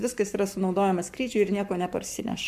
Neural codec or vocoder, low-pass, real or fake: codec, 44.1 kHz, 7.8 kbps, Pupu-Codec; 14.4 kHz; fake